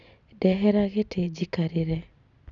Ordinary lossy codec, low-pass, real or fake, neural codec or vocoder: none; 7.2 kHz; real; none